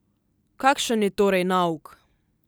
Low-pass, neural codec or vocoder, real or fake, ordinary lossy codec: none; none; real; none